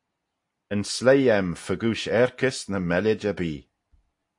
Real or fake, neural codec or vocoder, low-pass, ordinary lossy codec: real; none; 10.8 kHz; AAC, 64 kbps